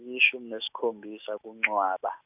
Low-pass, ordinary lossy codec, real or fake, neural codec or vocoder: 3.6 kHz; none; real; none